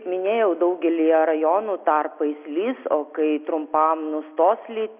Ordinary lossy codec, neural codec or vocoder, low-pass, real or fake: Opus, 64 kbps; none; 3.6 kHz; real